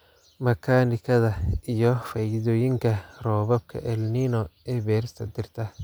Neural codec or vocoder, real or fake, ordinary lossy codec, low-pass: none; real; none; none